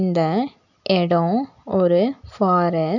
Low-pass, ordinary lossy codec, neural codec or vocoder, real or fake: 7.2 kHz; none; none; real